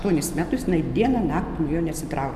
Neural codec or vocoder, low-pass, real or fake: none; 14.4 kHz; real